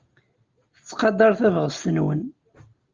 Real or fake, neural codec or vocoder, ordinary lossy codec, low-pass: real; none; Opus, 24 kbps; 7.2 kHz